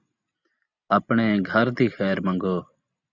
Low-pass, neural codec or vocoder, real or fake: 7.2 kHz; none; real